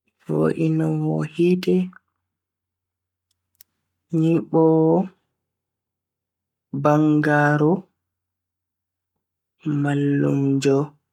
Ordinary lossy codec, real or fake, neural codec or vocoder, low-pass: none; fake; codec, 44.1 kHz, 7.8 kbps, Pupu-Codec; 19.8 kHz